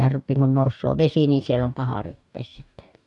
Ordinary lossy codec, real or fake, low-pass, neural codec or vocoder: none; fake; 10.8 kHz; codec, 44.1 kHz, 2.6 kbps, DAC